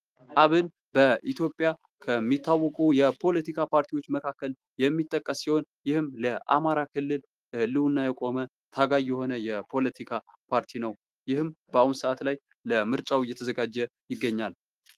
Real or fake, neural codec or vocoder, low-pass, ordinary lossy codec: fake; autoencoder, 48 kHz, 128 numbers a frame, DAC-VAE, trained on Japanese speech; 14.4 kHz; Opus, 24 kbps